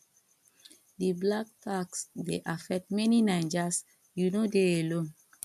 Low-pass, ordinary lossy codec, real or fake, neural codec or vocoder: 14.4 kHz; none; real; none